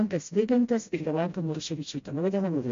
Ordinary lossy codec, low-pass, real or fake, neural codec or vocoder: MP3, 48 kbps; 7.2 kHz; fake; codec, 16 kHz, 0.5 kbps, FreqCodec, smaller model